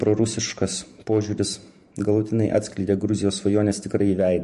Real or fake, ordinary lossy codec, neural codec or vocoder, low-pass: fake; MP3, 48 kbps; vocoder, 44.1 kHz, 128 mel bands every 512 samples, BigVGAN v2; 14.4 kHz